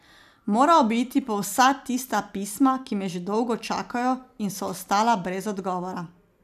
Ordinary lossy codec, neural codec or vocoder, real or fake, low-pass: none; none; real; 14.4 kHz